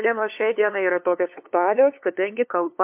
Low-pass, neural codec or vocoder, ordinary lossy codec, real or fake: 3.6 kHz; codec, 16 kHz, 2 kbps, FunCodec, trained on LibriTTS, 25 frames a second; MP3, 32 kbps; fake